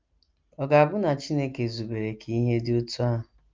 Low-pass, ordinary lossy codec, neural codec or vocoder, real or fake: 7.2 kHz; Opus, 24 kbps; none; real